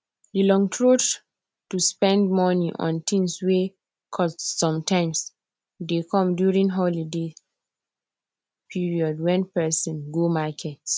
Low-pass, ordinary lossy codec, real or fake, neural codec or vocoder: none; none; real; none